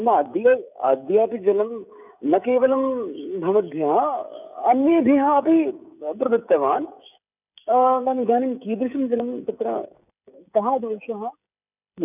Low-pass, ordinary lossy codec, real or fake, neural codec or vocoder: 3.6 kHz; none; fake; codec, 16 kHz, 16 kbps, FreqCodec, smaller model